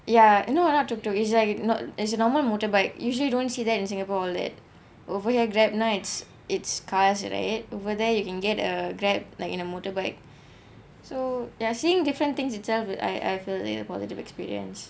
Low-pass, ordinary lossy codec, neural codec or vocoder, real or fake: none; none; none; real